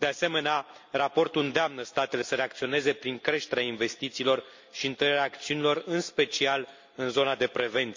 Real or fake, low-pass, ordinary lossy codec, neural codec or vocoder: real; 7.2 kHz; MP3, 48 kbps; none